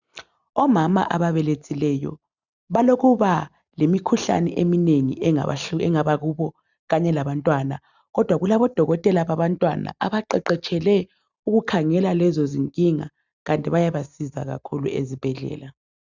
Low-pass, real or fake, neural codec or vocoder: 7.2 kHz; real; none